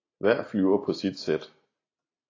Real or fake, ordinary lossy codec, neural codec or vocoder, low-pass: real; AAC, 32 kbps; none; 7.2 kHz